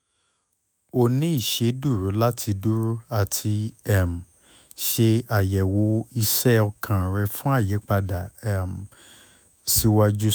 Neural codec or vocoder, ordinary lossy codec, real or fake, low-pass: autoencoder, 48 kHz, 128 numbers a frame, DAC-VAE, trained on Japanese speech; none; fake; none